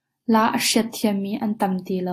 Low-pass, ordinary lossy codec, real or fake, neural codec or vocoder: 14.4 kHz; AAC, 48 kbps; real; none